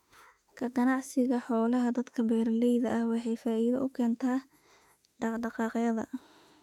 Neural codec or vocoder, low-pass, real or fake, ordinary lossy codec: autoencoder, 48 kHz, 32 numbers a frame, DAC-VAE, trained on Japanese speech; 19.8 kHz; fake; none